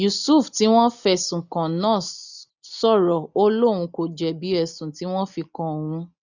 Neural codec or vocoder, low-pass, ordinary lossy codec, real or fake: none; 7.2 kHz; none; real